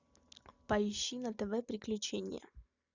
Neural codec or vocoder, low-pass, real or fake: none; 7.2 kHz; real